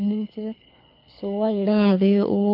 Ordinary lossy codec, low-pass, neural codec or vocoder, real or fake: none; 5.4 kHz; codec, 24 kHz, 6 kbps, HILCodec; fake